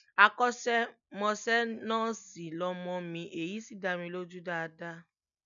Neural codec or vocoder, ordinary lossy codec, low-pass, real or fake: none; none; 7.2 kHz; real